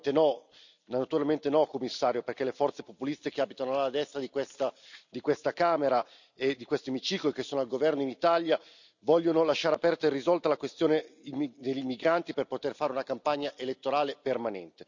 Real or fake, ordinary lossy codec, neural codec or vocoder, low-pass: real; none; none; 7.2 kHz